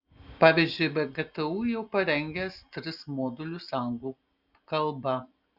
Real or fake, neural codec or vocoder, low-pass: real; none; 5.4 kHz